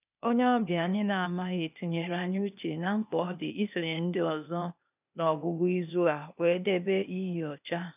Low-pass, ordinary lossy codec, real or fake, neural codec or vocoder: 3.6 kHz; none; fake; codec, 16 kHz, 0.8 kbps, ZipCodec